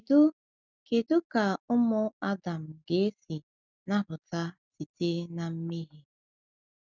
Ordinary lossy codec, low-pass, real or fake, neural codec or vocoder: none; 7.2 kHz; real; none